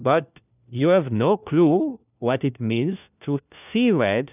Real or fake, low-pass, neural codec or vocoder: fake; 3.6 kHz; codec, 16 kHz, 1 kbps, FunCodec, trained on LibriTTS, 50 frames a second